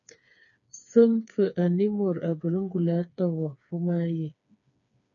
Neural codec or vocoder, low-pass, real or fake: codec, 16 kHz, 4 kbps, FreqCodec, smaller model; 7.2 kHz; fake